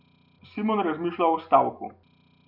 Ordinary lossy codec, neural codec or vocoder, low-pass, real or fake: none; none; 5.4 kHz; real